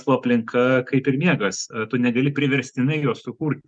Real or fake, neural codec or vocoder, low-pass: real; none; 9.9 kHz